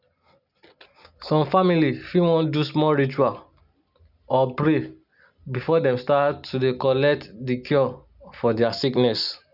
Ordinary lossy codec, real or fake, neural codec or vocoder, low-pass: none; real; none; 5.4 kHz